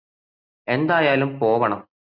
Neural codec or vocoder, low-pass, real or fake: none; 5.4 kHz; real